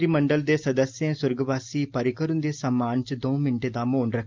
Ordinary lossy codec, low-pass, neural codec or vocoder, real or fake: Opus, 32 kbps; 7.2 kHz; none; real